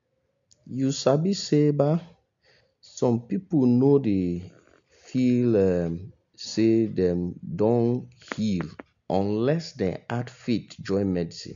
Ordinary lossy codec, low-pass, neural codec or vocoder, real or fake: MP3, 64 kbps; 7.2 kHz; none; real